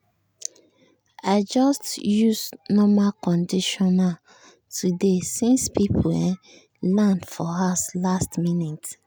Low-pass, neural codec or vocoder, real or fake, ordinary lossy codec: none; none; real; none